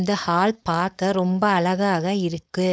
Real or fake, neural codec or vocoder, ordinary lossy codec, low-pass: fake; codec, 16 kHz, 4.8 kbps, FACodec; none; none